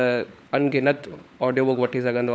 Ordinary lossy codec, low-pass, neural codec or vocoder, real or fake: none; none; codec, 16 kHz, 16 kbps, FunCodec, trained on LibriTTS, 50 frames a second; fake